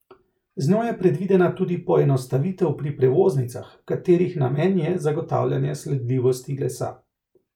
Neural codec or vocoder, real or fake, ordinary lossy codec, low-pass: none; real; none; 19.8 kHz